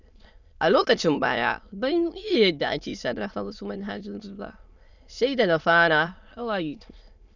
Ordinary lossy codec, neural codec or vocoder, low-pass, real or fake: none; autoencoder, 22.05 kHz, a latent of 192 numbers a frame, VITS, trained on many speakers; 7.2 kHz; fake